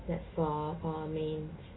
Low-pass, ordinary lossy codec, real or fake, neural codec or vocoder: 7.2 kHz; AAC, 16 kbps; real; none